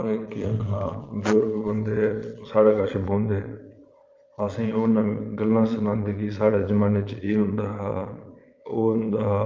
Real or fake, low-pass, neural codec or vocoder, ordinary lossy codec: fake; 7.2 kHz; vocoder, 22.05 kHz, 80 mel bands, Vocos; Opus, 32 kbps